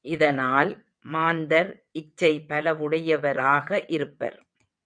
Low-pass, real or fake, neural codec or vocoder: 9.9 kHz; fake; vocoder, 22.05 kHz, 80 mel bands, WaveNeXt